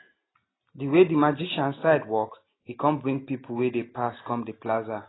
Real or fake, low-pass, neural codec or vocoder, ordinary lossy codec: real; 7.2 kHz; none; AAC, 16 kbps